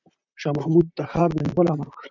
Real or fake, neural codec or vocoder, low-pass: fake; vocoder, 44.1 kHz, 128 mel bands, Pupu-Vocoder; 7.2 kHz